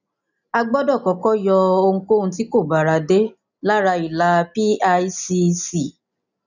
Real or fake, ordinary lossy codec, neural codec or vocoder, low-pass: real; none; none; 7.2 kHz